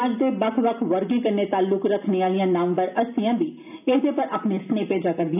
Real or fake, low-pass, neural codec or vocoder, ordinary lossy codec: real; 3.6 kHz; none; none